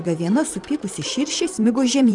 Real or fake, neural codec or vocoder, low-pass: fake; vocoder, 44.1 kHz, 128 mel bands every 512 samples, BigVGAN v2; 10.8 kHz